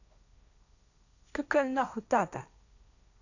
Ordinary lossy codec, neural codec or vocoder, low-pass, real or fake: none; codec, 16 kHz, 1.1 kbps, Voila-Tokenizer; 7.2 kHz; fake